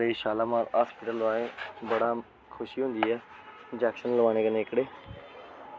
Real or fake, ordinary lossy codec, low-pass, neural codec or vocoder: real; none; none; none